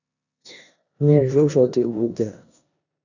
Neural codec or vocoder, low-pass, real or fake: codec, 16 kHz in and 24 kHz out, 0.9 kbps, LongCat-Audio-Codec, four codebook decoder; 7.2 kHz; fake